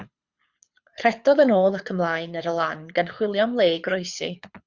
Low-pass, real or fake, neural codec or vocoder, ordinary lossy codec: 7.2 kHz; fake; codec, 24 kHz, 6 kbps, HILCodec; Opus, 64 kbps